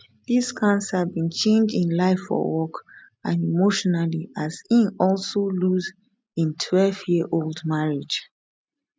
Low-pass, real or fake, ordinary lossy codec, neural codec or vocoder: none; real; none; none